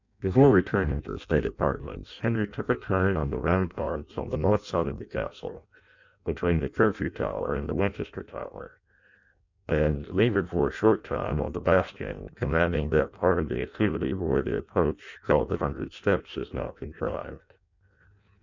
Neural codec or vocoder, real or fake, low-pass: codec, 16 kHz in and 24 kHz out, 0.6 kbps, FireRedTTS-2 codec; fake; 7.2 kHz